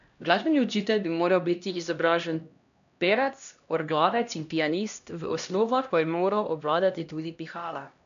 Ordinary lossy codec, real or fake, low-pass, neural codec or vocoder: none; fake; 7.2 kHz; codec, 16 kHz, 1 kbps, X-Codec, HuBERT features, trained on LibriSpeech